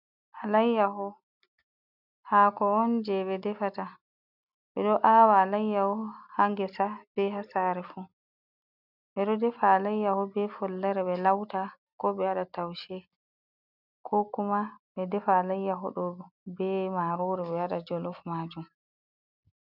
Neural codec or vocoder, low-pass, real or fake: none; 5.4 kHz; real